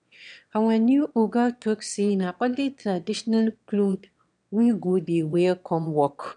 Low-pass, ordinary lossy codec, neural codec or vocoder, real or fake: 9.9 kHz; none; autoencoder, 22.05 kHz, a latent of 192 numbers a frame, VITS, trained on one speaker; fake